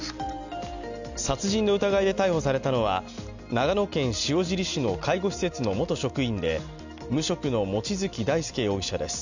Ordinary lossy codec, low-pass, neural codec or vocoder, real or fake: none; 7.2 kHz; none; real